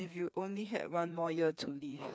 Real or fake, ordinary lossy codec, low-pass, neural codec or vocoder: fake; none; none; codec, 16 kHz, 2 kbps, FreqCodec, larger model